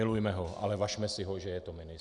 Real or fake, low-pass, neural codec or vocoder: real; 10.8 kHz; none